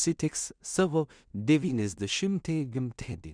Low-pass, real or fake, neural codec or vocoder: 9.9 kHz; fake; codec, 16 kHz in and 24 kHz out, 0.4 kbps, LongCat-Audio-Codec, two codebook decoder